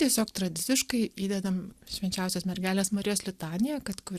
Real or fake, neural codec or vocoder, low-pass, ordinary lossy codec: real; none; 14.4 kHz; Opus, 64 kbps